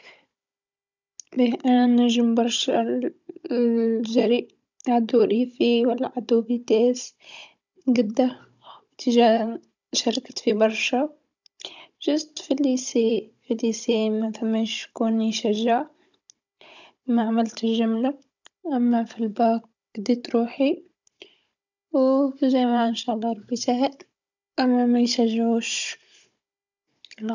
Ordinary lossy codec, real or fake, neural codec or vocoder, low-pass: none; fake; codec, 16 kHz, 16 kbps, FunCodec, trained on Chinese and English, 50 frames a second; 7.2 kHz